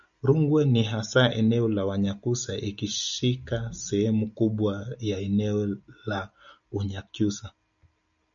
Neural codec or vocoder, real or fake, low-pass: none; real; 7.2 kHz